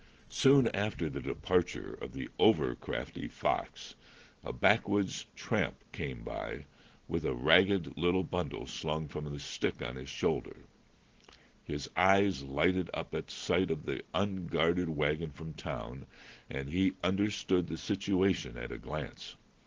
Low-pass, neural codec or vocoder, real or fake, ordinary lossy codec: 7.2 kHz; none; real; Opus, 16 kbps